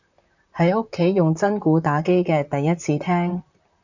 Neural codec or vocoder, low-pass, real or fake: vocoder, 44.1 kHz, 128 mel bands, Pupu-Vocoder; 7.2 kHz; fake